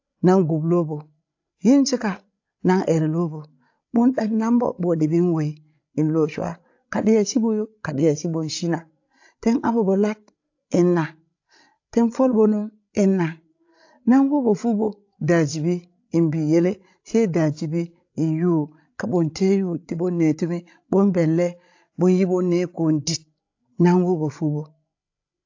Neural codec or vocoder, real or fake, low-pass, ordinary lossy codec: codec, 16 kHz, 16 kbps, FreqCodec, larger model; fake; 7.2 kHz; AAC, 48 kbps